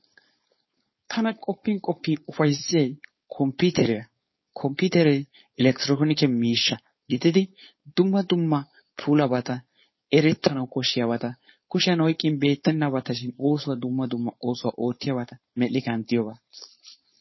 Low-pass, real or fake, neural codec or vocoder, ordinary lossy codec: 7.2 kHz; fake; codec, 16 kHz, 4.8 kbps, FACodec; MP3, 24 kbps